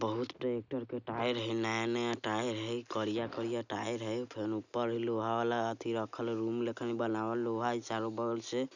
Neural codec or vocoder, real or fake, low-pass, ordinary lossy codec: none; real; 7.2 kHz; none